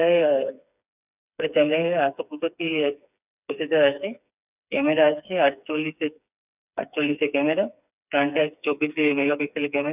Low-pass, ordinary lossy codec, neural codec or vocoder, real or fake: 3.6 kHz; none; codec, 16 kHz, 4 kbps, FreqCodec, smaller model; fake